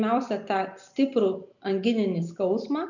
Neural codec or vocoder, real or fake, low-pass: none; real; 7.2 kHz